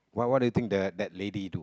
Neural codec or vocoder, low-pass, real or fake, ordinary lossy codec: none; none; real; none